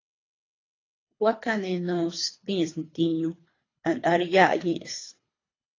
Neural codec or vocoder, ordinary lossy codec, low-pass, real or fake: codec, 24 kHz, 3 kbps, HILCodec; AAC, 32 kbps; 7.2 kHz; fake